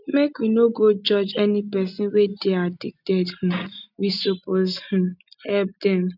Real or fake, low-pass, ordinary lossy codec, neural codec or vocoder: real; 5.4 kHz; none; none